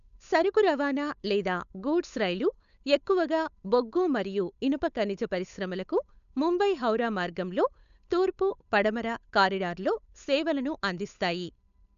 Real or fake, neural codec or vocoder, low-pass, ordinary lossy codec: fake; codec, 16 kHz, 8 kbps, FunCodec, trained on Chinese and English, 25 frames a second; 7.2 kHz; none